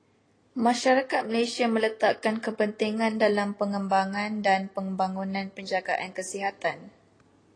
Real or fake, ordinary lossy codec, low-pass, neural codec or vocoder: real; AAC, 32 kbps; 9.9 kHz; none